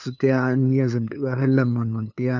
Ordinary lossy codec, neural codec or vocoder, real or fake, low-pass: none; codec, 16 kHz, 2 kbps, FunCodec, trained on LibriTTS, 25 frames a second; fake; 7.2 kHz